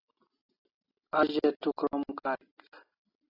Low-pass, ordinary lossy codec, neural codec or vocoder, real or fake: 5.4 kHz; AAC, 24 kbps; none; real